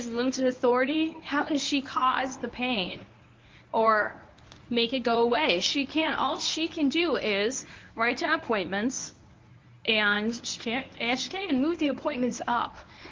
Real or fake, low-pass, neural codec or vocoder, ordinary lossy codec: fake; 7.2 kHz; codec, 24 kHz, 0.9 kbps, WavTokenizer, medium speech release version 1; Opus, 32 kbps